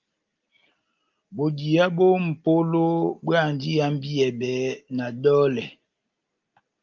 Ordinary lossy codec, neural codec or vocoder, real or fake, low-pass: Opus, 24 kbps; none; real; 7.2 kHz